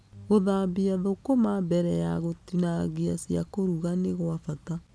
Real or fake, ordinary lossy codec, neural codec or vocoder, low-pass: real; none; none; none